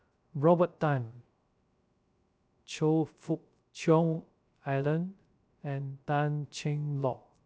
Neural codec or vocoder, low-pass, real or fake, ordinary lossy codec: codec, 16 kHz, 0.3 kbps, FocalCodec; none; fake; none